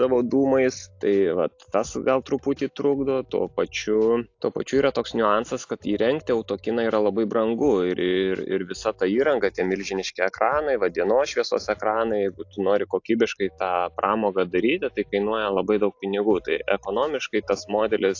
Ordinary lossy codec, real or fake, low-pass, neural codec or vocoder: AAC, 48 kbps; real; 7.2 kHz; none